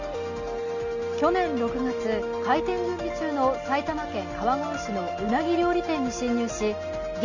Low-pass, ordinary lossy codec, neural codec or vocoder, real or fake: 7.2 kHz; Opus, 64 kbps; none; real